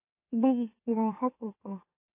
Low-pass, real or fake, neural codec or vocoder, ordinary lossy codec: 3.6 kHz; fake; autoencoder, 44.1 kHz, a latent of 192 numbers a frame, MeloTTS; AAC, 24 kbps